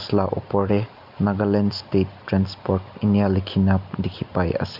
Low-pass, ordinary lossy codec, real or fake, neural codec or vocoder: 5.4 kHz; none; real; none